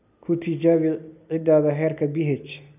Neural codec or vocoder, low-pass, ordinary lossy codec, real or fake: none; 3.6 kHz; none; real